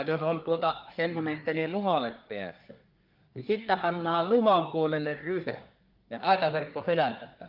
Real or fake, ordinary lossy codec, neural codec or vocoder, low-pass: fake; Opus, 32 kbps; codec, 24 kHz, 1 kbps, SNAC; 5.4 kHz